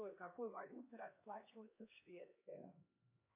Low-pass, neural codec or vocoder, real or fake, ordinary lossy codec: 3.6 kHz; codec, 16 kHz, 2 kbps, X-Codec, HuBERT features, trained on LibriSpeech; fake; MP3, 24 kbps